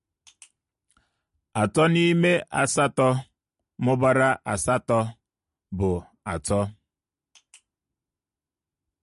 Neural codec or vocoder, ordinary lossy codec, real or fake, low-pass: none; MP3, 48 kbps; real; 14.4 kHz